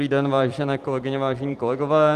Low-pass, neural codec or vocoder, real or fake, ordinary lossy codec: 14.4 kHz; vocoder, 44.1 kHz, 128 mel bands every 256 samples, BigVGAN v2; fake; Opus, 24 kbps